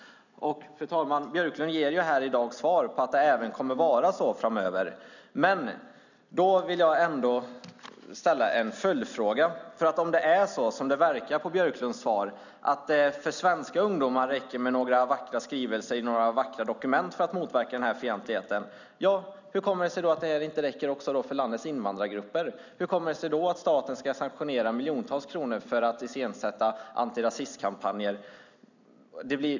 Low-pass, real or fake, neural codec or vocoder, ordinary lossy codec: 7.2 kHz; real; none; none